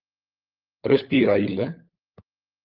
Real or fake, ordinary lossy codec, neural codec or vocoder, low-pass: fake; Opus, 24 kbps; codec, 16 kHz, 16 kbps, FunCodec, trained on LibriTTS, 50 frames a second; 5.4 kHz